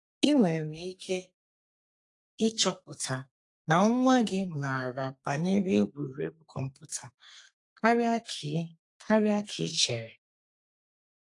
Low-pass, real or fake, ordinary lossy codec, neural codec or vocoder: 10.8 kHz; fake; AAC, 48 kbps; codec, 32 kHz, 1.9 kbps, SNAC